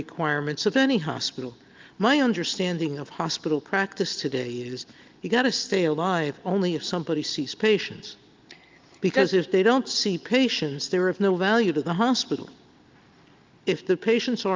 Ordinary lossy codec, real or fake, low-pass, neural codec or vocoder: Opus, 32 kbps; real; 7.2 kHz; none